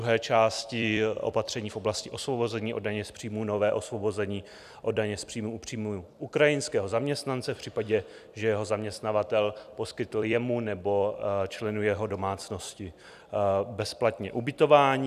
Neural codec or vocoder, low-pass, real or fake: vocoder, 44.1 kHz, 128 mel bands every 256 samples, BigVGAN v2; 14.4 kHz; fake